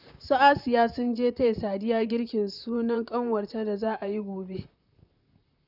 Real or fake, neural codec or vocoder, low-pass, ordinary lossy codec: fake; vocoder, 44.1 kHz, 128 mel bands, Pupu-Vocoder; 5.4 kHz; none